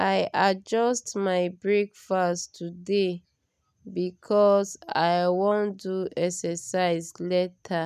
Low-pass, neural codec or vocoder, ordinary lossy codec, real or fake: 14.4 kHz; none; none; real